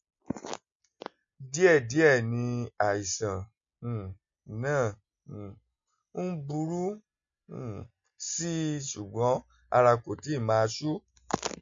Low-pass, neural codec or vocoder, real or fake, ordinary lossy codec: 7.2 kHz; none; real; MP3, 48 kbps